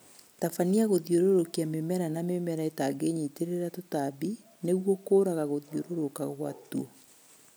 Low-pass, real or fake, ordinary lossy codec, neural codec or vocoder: none; real; none; none